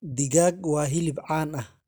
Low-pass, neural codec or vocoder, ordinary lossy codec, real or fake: none; none; none; real